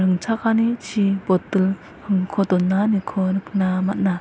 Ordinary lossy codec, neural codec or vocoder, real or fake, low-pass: none; none; real; none